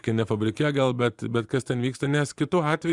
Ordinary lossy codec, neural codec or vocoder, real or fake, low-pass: Opus, 64 kbps; vocoder, 24 kHz, 100 mel bands, Vocos; fake; 10.8 kHz